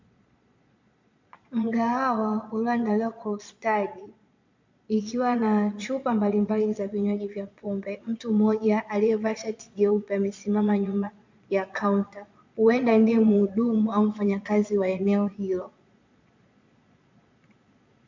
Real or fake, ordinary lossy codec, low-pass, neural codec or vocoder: fake; MP3, 64 kbps; 7.2 kHz; vocoder, 22.05 kHz, 80 mel bands, Vocos